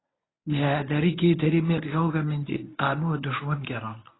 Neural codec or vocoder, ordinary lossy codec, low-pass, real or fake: codec, 24 kHz, 0.9 kbps, WavTokenizer, medium speech release version 1; AAC, 16 kbps; 7.2 kHz; fake